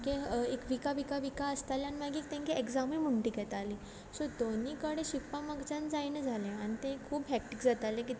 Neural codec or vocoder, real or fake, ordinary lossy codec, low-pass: none; real; none; none